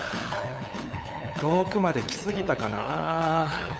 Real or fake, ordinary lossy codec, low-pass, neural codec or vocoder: fake; none; none; codec, 16 kHz, 8 kbps, FunCodec, trained on LibriTTS, 25 frames a second